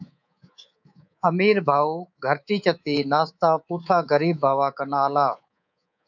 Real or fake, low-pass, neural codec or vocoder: fake; 7.2 kHz; codec, 24 kHz, 3.1 kbps, DualCodec